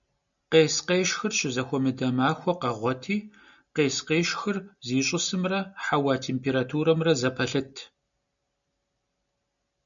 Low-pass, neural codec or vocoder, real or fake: 7.2 kHz; none; real